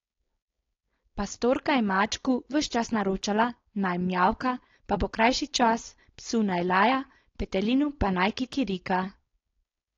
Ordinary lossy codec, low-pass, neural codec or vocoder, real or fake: AAC, 32 kbps; 7.2 kHz; codec, 16 kHz, 4.8 kbps, FACodec; fake